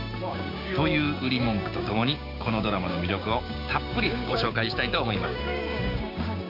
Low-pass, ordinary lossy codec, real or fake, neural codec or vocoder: 5.4 kHz; none; fake; codec, 16 kHz, 6 kbps, DAC